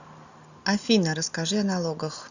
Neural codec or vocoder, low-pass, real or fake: none; 7.2 kHz; real